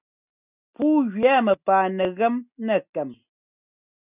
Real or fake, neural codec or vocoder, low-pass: real; none; 3.6 kHz